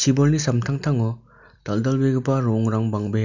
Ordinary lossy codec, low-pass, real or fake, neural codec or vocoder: none; 7.2 kHz; real; none